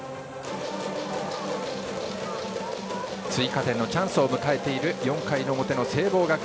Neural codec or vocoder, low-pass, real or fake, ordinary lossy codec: none; none; real; none